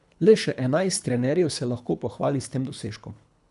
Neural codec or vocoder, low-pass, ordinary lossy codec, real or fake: codec, 24 kHz, 3 kbps, HILCodec; 10.8 kHz; none; fake